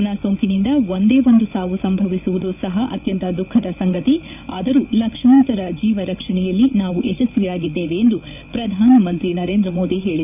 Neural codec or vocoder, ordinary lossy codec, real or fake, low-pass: codec, 16 kHz, 8 kbps, FreqCodec, larger model; none; fake; 3.6 kHz